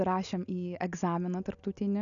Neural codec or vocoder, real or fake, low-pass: none; real; 7.2 kHz